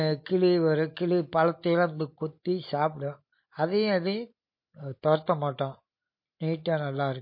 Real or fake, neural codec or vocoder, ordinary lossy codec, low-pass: real; none; MP3, 32 kbps; 5.4 kHz